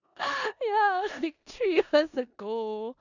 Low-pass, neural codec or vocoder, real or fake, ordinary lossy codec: 7.2 kHz; codec, 16 kHz in and 24 kHz out, 0.9 kbps, LongCat-Audio-Codec, four codebook decoder; fake; none